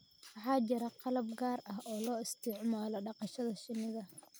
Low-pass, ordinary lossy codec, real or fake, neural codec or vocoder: none; none; real; none